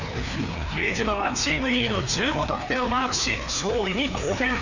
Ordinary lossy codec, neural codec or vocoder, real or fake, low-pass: none; codec, 16 kHz, 2 kbps, FreqCodec, larger model; fake; 7.2 kHz